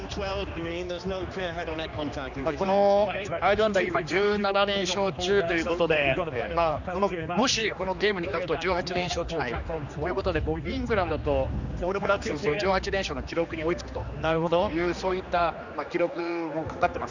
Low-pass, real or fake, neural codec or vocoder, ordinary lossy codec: 7.2 kHz; fake; codec, 16 kHz, 2 kbps, X-Codec, HuBERT features, trained on general audio; none